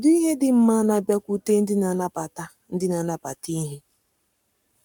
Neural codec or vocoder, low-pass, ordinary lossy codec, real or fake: codec, 44.1 kHz, 7.8 kbps, Pupu-Codec; 19.8 kHz; none; fake